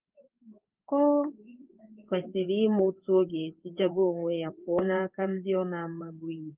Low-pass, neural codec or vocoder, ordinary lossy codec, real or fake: 3.6 kHz; codec, 16 kHz in and 24 kHz out, 1 kbps, XY-Tokenizer; Opus, 32 kbps; fake